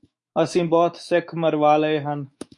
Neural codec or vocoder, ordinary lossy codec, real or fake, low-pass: autoencoder, 48 kHz, 128 numbers a frame, DAC-VAE, trained on Japanese speech; MP3, 48 kbps; fake; 10.8 kHz